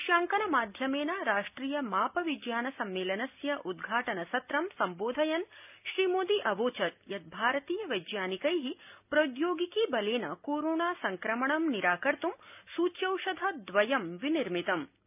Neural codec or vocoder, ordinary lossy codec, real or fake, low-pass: none; none; real; 3.6 kHz